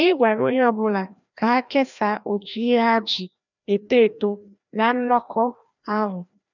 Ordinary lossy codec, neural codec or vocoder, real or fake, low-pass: none; codec, 16 kHz, 1 kbps, FreqCodec, larger model; fake; 7.2 kHz